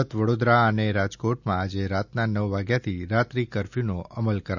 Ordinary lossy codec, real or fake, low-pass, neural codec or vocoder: none; real; 7.2 kHz; none